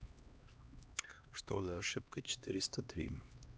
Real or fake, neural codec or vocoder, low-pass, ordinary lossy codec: fake; codec, 16 kHz, 1 kbps, X-Codec, HuBERT features, trained on LibriSpeech; none; none